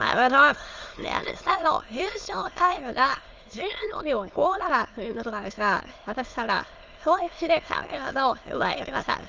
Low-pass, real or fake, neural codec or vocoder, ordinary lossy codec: 7.2 kHz; fake; autoencoder, 22.05 kHz, a latent of 192 numbers a frame, VITS, trained on many speakers; Opus, 32 kbps